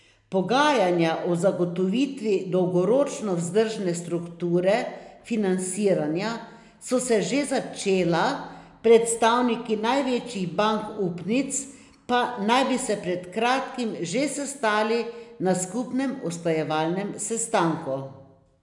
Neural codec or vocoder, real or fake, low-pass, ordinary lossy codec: none; real; 10.8 kHz; none